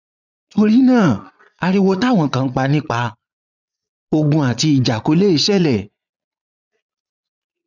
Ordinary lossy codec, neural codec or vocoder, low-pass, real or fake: none; vocoder, 22.05 kHz, 80 mel bands, Vocos; 7.2 kHz; fake